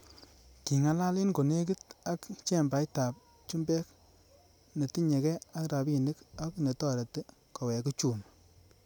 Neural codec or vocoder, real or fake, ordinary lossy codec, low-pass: none; real; none; none